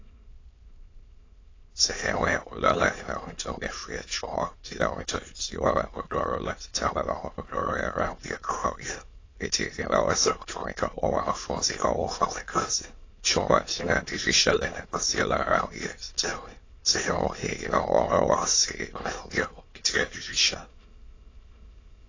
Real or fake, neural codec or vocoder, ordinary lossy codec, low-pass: fake; autoencoder, 22.05 kHz, a latent of 192 numbers a frame, VITS, trained on many speakers; AAC, 32 kbps; 7.2 kHz